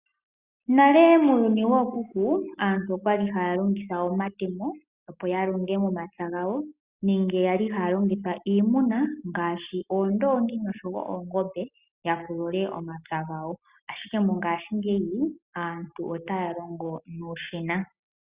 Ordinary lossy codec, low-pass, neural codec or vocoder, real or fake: Opus, 64 kbps; 3.6 kHz; none; real